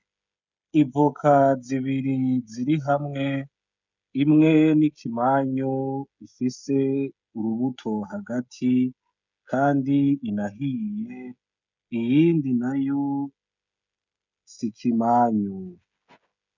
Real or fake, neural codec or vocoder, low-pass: fake; codec, 16 kHz, 16 kbps, FreqCodec, smaller model; 7.2 kHz